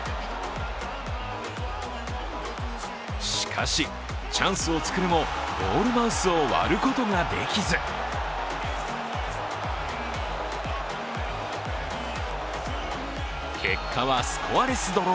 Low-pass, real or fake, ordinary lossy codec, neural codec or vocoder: none; real; none; none